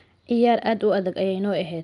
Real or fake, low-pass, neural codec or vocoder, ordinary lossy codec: real; 10.8 kHz; none; none